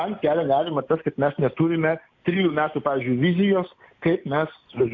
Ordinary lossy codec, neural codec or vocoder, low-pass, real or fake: AAC, 48 kbps; none; 7.2 kHz; real